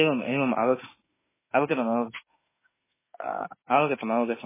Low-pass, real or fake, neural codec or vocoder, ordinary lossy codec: 3.6 kHz; fake; autoencoder, 48 kHz, 32 numbers a frame, DAC-VAE, trained on Japanese speech; MP3, 16 kbps